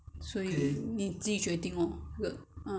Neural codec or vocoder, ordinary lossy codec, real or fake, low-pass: none; none; real; none